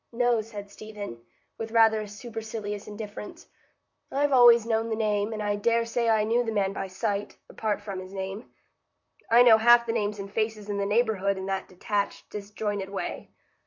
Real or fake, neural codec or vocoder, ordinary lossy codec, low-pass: fake; vocoder, 44.1 kHz, 128 mel bands, Pupu-Vocoder; MP3, 48 kbps; 7.2 kHz